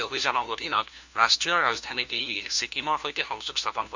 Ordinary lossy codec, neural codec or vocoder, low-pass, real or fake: Opus, 64 kbps; codec, 16 kHz, 1 kbps, FunCodec, trained on LibriTTS, 50 frames a second; 7.2 kHz; fake